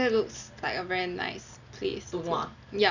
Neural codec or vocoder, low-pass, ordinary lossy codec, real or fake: none; 7.2 kHz; none; real